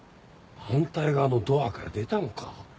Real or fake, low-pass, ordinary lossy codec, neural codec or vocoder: real; none; none; none